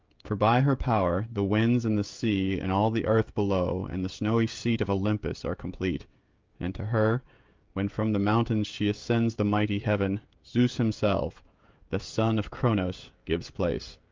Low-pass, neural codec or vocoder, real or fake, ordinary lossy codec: 7.2 kHz; codec, 16 kHz, 16 kbps, FreqCodec, smaller model; fake; Opus, 24 kbps